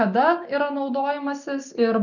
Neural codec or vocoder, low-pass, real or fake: none; 7.2 kHz; real